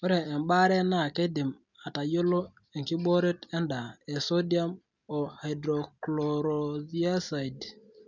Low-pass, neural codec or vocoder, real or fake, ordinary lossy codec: 7.2 kHz; none; real; none